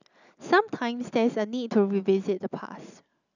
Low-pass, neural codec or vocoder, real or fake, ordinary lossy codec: 7.2 kHz; none; real; none